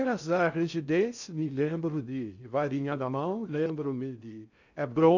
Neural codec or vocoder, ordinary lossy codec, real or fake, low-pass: codec, 16 kHz in and 24 kHz out, 0.6 kbps, FocalCodec, streaming, 4096 codes; none; fake; 7.2 kHz